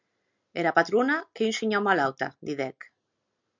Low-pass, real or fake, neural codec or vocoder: 7.2 kHz; real; none